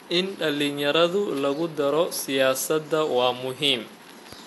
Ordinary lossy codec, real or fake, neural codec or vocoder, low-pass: AAC, 64 kbps; real; none; 14.4 kHz